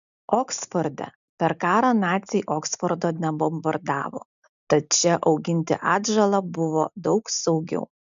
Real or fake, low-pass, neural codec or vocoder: real; 7.2 kHz; none